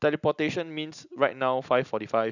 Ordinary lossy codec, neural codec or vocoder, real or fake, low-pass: none; none; real; 7.2 kHz